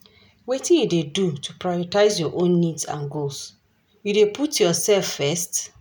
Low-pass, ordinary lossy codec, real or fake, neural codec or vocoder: none; none; real; none